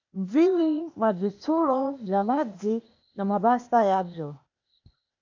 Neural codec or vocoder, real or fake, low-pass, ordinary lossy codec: codec, 16 kHz, 0.8 kbps, ZipCodec; fake; 7.2 kHz; MP3, 64 kbps